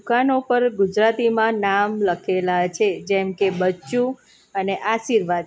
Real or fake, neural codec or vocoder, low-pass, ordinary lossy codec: real; none; none; none